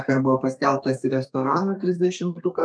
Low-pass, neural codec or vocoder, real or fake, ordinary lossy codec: 9.9 kHz; codec, 32 kHz, 1.9 kbps, SNAC; fake; MP3, 96 kbps